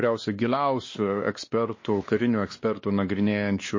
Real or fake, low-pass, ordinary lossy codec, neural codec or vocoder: fake; 7.2 kHz; MP3, 32 kbps; codec, 16 kHz, 2 kbps, X-Codec, WavLM features, trained on Multilingual LibriSpeech